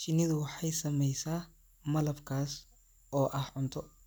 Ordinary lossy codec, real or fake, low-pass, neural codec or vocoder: none; real; none; none